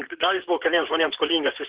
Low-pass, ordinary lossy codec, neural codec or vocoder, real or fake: 3.6 kHz; Opus, 16 kbps; vocoder, 44.1 kHz, 128 mel bands, Pupu-Vocoder; fake